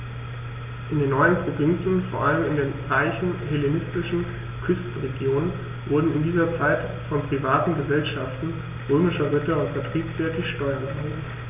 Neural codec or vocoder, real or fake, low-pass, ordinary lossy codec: none; real; 3.6 kHz; AAC, 32 kbps